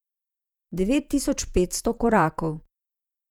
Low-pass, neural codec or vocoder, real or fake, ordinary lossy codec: 19.8 kHz; vocoder, 48 kHz, 128 mel bands, Vocos; fake; none